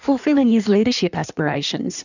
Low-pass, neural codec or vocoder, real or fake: 7.2 kHz; codec, 16 kHz in and 24 kHz out, 1.1 kbps, FireRedTTS-2 codec; fake